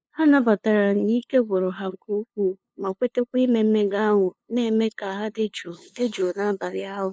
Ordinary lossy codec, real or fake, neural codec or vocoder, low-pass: none; fake; codec, 16 kHz, 2 kbps, FunCodec, trained on LibriTTS, 25 frames a second; none